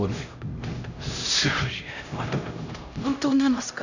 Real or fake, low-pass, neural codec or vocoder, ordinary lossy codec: fake; 7.2 kHz; codec, 16 kHz, 0.5 kbps, X-Codec, HuBERT features, trained on LibriSpeech; none